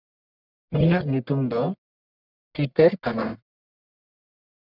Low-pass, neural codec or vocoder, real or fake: 5.4 kHz; codec, 44.1 kHz, 1.7 kbps, Pupu-Codec; fake